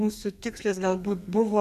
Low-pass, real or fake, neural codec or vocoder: 14.4 kHz; fake; codec, 32 kHz, 1.9 kbps, SNAC